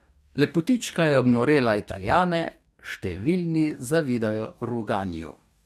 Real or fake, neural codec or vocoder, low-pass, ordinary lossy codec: fake; codec, 44.1 kHz, 2.6 kbps, DAC; 14.4 kHz; none